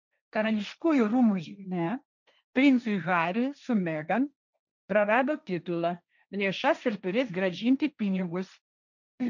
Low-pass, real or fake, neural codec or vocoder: 7.2 kHz; fake; codec, 16 kHz, 1.1 kbps, Voila-Tokenizer